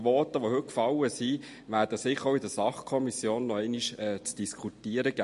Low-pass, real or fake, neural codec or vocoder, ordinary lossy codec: 14.4 kHz; real; none; MP3, 48 kbps